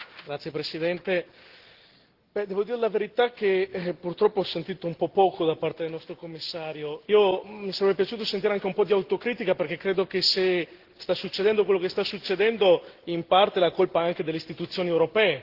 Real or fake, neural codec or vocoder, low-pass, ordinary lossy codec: real; none; 5.4 kHz; Opus, 16 kbps